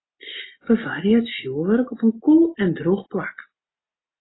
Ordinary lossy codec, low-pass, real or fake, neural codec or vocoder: AAC, 16 kbps; 7.2 kHz; real; none